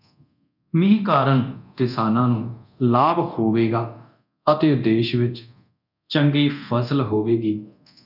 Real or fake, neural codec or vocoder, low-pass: fake; codec, 24 kHz, 0.9 kbps, DualCodec; 5.4 kHz